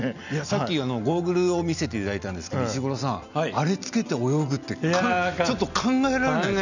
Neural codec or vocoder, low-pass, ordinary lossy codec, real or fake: none; 7.2 kHz; none; real